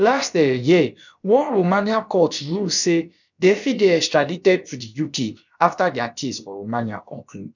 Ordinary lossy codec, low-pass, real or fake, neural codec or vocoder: none; 7.2 kHz; fake; codec, 16 kHz, about 1 kbps, DyCAST, with the encoder's durations